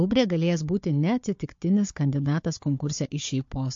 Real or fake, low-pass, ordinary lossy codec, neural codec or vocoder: fake; 7.2 kHz; MP3, 48 kbps; codec, 16 kHz, 4 kbps, FunCodec, trained on Chinese and English, 50 frames a second